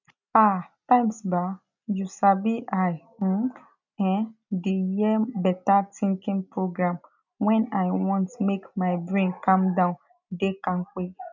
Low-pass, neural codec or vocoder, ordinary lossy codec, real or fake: 7.2 kHz; none; none; real